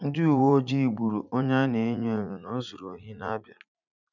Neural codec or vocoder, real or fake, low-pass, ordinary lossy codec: vocoder, 44.1 kHz, 80 mel bands, Vocos; fake; 7.2 kHz; none